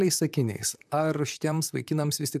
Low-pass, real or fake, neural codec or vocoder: 14.4 kHz; fake; vocoder, 44.1 kHz, 128 mel bands, Pupu-Vocoder